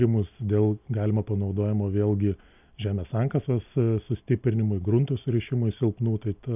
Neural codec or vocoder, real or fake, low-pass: none; real; 3.6 kHz